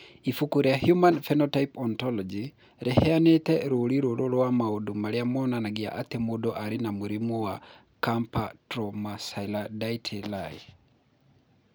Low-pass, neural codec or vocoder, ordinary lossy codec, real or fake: none; none; none; real